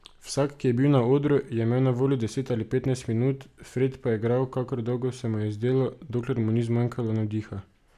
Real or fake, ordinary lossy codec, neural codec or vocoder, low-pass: real; none; none; 14.4 kHz